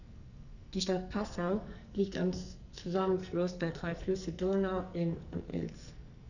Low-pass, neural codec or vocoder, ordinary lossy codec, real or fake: 7.2 kHz; codec, 44.1 kHz, 2.6 kbps, SNAC; none; fake